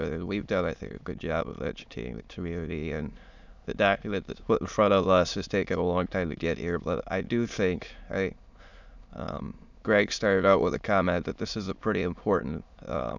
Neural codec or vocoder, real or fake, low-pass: autoencoder, 22.05 kHz, a latent of 192 numbers a frame, VITS, trained on many speakers; fake; 7.2 kHz